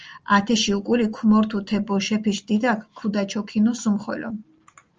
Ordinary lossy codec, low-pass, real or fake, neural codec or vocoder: Opus, 24 kbps; 7.2 kHz; real; none